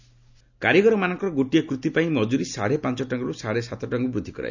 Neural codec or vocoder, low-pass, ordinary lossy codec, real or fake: none; 7.2 kHz; none; real